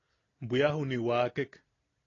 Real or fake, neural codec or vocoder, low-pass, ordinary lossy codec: real; none; 7.2 kHz; AAC, 32 kbps